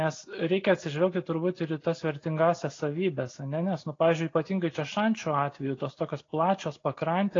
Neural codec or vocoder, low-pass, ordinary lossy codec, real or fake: none; 7.2 kHz; AAC, 32 kbps; real